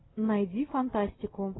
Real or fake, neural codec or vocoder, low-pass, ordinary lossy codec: real; none; 7.2 kHz; AAC, 16 kbps